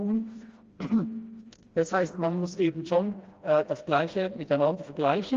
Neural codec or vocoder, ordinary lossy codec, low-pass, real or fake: codec, 16 kHz, 1 kbps, FreqCodec, smaller model; Opus, 32 kbps; 7.2 kHz; fake